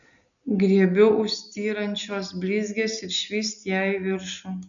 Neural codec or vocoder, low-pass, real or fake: none; 7.2 kHz; real